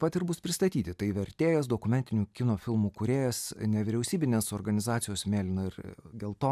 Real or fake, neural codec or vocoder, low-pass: real; none; 14.4 kHz